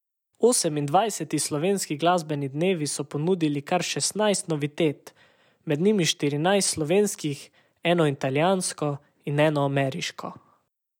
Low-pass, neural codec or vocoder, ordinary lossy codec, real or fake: 19.8 kHz; none; none; real